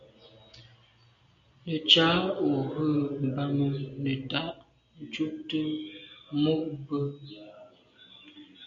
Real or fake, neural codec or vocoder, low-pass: real; none; 7.2 kHz